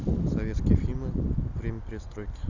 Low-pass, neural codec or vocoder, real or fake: 7.2 kHz; none; real